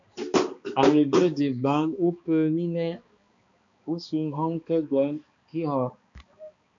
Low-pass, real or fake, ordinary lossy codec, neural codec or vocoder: 7.2 kHz; fake; MP3, 64 kbps; codec, 16 kHz, 2 kbps, X-Codec, HuBERT features, trained on balanced general audio